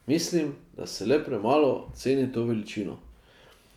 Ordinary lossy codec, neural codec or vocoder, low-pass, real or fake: MP3, 96 kbps; none; 19.8 kHz; real